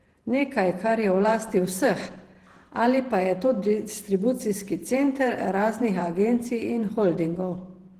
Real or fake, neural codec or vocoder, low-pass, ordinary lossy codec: fake; vocoder, 48 kHz, 128 mel bands, Vocos; 14.4 kHz; Opus, 16 kbps